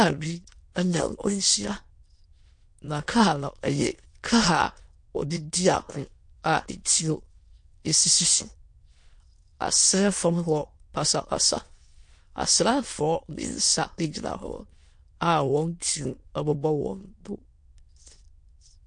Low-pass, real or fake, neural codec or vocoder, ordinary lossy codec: 9.9 kHz; fake; autoencoder, 22.05 kHz, a latent of 192 numbers a frame, VITS, trained on many speakers; MP3, 48 kbps